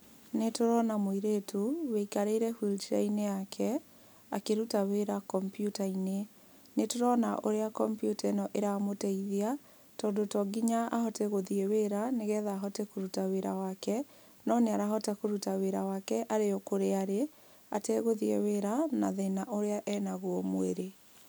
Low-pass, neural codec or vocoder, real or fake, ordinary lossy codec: none; none; real; none